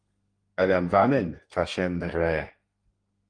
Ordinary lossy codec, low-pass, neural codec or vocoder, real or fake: Opus, 32 kbps; 9.9 kHz; codec, 32 kHz, 1.9 kbps, SNAC; fake